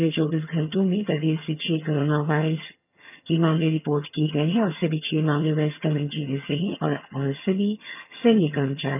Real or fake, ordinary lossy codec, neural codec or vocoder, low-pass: fake; none; vocoder, 22.05 kHz, 80 mel bands, HiFi-GAN; 3.6 kHz